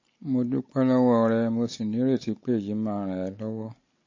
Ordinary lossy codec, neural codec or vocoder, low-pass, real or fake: MP3, 32 kbps; none; 7.2 kHz; real